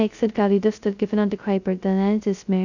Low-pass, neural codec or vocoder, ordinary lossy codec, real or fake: 7.2 kHz; codec, 16 kHz, 0.2 kbps, FocalCodec; none; fake